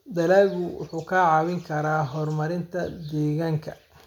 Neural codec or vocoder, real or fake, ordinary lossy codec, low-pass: none; real; none; 19.8 kHz